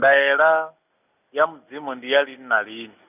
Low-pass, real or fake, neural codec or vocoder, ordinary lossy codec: 3.6 kHz; real; none; none